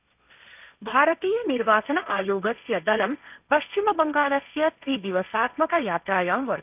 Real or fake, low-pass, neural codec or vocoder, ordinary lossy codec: fake; 3.6 kHz; codec, 16 kHz, 1.1 kbps, Voila-Tokenizer; none